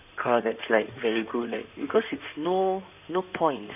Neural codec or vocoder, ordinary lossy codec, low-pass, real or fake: codec, 16 kHz in and 24 kHz out, 2.2 kbps, FireRedTTS-2 codec; none; 3.6 kHz; fake